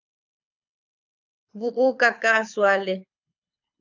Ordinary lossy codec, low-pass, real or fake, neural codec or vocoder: AAC, 48 kbps; 7.2 kHz; fake; codec, 24 kHz, 6 kbps, HILCodec